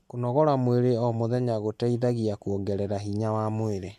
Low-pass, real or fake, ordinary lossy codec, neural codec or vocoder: 14.4 kHz; real; MP3, 64 kbps; none